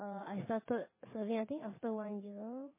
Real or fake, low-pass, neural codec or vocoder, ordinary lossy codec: fake; 3.6 kHz; vocoder, 22.05 kHz, 80 mel bands, Vocos; MP3, 16 kbps